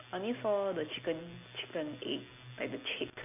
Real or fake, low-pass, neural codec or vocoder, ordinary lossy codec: real; 3.6 kHz; none; none